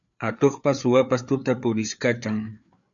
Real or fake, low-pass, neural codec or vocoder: fake; 7.2 kHz; codec, 16 kHz, 4 kbps, FreqCodec, larger model